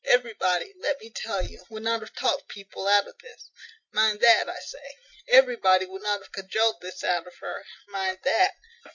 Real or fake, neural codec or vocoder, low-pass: real; none; 7.2 kHz